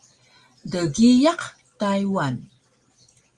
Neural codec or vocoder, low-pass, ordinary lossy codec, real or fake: none; 10.8 kHz; Opus, 24 kbps; real